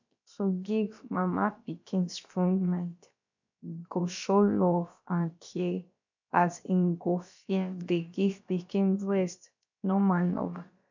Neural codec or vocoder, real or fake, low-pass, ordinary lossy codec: codec, 16 kHz, about 1 kbps, DyCAST, with the encoder's durations; fake; 7.2 kHz; MP3, 64 kbps